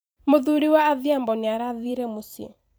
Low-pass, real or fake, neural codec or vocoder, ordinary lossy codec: none; fake; vocoder, 44.1 kHz, 128 mel bands every 512 samples, BigVGAN v2; none